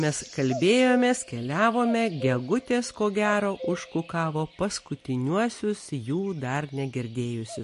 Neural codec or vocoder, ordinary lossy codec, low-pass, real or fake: none; MP3, 48 kbps; 14.4 kHz; real